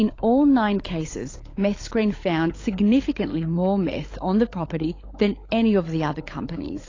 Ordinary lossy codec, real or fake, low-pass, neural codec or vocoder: AAC, 32 kbps; fake; 7.2 kHz; codec, 16 kHz, 16 kbps, FunCodec, trained on LibriTTS, 50 frames a second